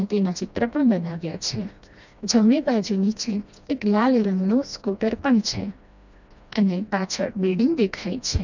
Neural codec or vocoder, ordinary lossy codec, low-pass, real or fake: codec, 16 kHz, 1 kbps, FreqCodec, smaller model; none; 7.2 kHz; fake